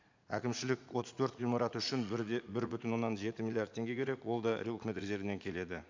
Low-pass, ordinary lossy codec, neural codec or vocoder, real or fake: 7.2 kHz; MP3, 64 kbps; vocoder, 44.1 kHz, 80 mel bands, Vocos; fake